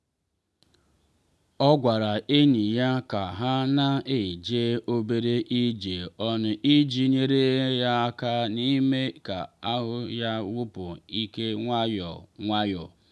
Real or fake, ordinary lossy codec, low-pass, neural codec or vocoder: real; none; none; none